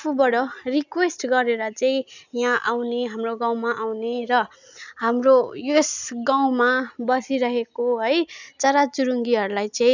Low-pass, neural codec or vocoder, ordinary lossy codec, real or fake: 7.2 kHz; none; none; real